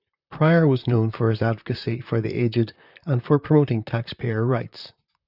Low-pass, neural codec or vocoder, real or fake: 5.4 kHz; vocoder, 44.1 kHz, 128 mel bands, Pupu-Vocoder; fake